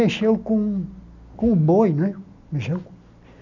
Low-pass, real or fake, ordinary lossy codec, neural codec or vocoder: 7.2 kHz; real; none; none